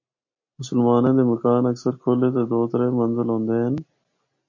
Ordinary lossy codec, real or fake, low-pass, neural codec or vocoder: MP3, 32 kbps; real; 7.2 kHz; none